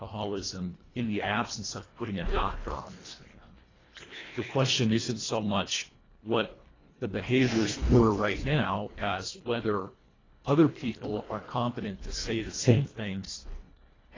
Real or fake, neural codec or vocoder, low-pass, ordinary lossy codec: fake; codec, 24 kHz, 1.5 kbps, HILCodec; 7.2 kHz; AAC, 32 kbps